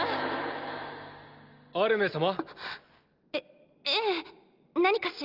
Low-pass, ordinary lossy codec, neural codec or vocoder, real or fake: 5.4 kHz; Opus, 32 kbps; none; real